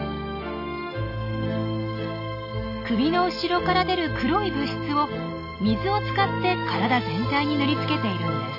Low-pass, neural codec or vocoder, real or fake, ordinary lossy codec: 5.4 kHz; none; real; none